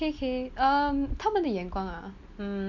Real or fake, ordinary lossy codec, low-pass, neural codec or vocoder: real; none; 7.2 kHz; none